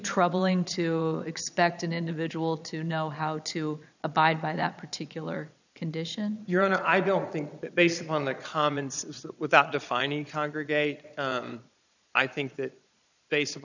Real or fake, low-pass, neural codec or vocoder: fake; 7.2 kHz; vocoder, 22.05 kHz, 80 mel bands, Vocos